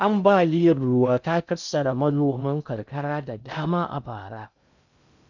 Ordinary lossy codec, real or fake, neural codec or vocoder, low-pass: none; fake; codec, 16 kHz in and 24 kHz out, 0.6 kbps, FocalCodec, streaming, 2048 codes; 7.2 kHz